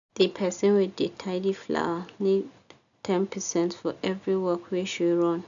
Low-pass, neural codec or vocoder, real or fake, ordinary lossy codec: 7.2 kHz; none; real; none